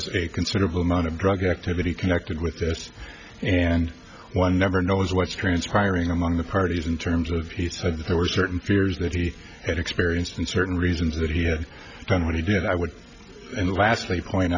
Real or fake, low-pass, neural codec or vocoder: real; 7.2 kHz; none